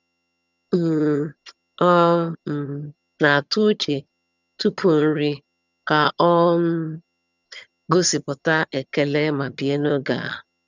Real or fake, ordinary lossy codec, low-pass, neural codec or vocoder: fake; none; 7.2 kHz; vocoder, 22.05 kHz, 80 mel bands, HiFi-GAN